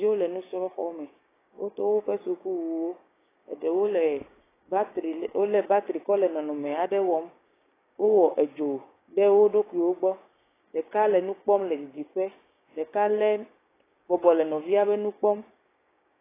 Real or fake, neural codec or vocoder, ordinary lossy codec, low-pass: fake; codec, 16 kHz, 6 kbps, DAC; AAC, 16 kbps; 3.6 kHz